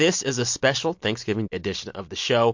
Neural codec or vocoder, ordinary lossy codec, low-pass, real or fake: none; MP3, 48 kbps; 7.2 kHz; real